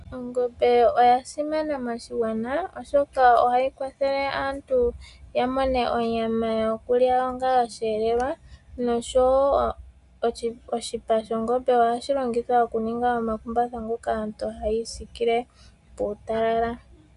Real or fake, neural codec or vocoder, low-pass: real; none; 10.8 kHz